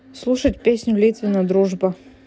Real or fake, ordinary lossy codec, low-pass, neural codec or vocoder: real; none; none; none